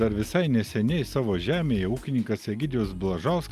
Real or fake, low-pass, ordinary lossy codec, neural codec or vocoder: real; 14.4 kHz; Opus, 32 kbps; none